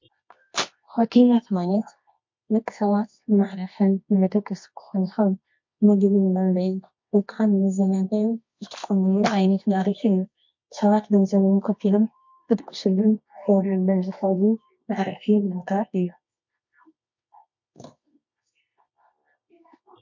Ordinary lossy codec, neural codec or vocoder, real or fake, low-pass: MP3, 48 kbps; codec, 24 kHz, 0.9 kbps, WavTokenizer, medium music audio release; fake; 7.2 kHz